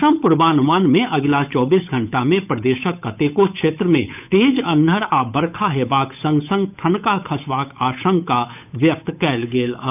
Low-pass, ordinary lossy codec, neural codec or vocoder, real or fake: 3.6 kHz; none; codec, 16 kHz, 8 kbps, FunCodec, trained on Chinese and English, 25 frames a second; fake